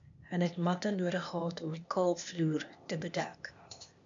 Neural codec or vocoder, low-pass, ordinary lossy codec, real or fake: codec, 16 kHz, 0.8 kbps, ZipCodec; 7.2 kHz; MP3, 64 kbps; fake